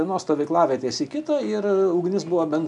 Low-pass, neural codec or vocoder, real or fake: 10.8 kHz; none; real